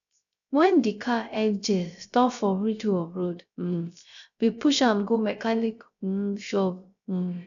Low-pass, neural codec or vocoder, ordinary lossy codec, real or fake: 7.2 kHz; codec, 16 kHz, 0.3 kbps, FocalCodec; none; fake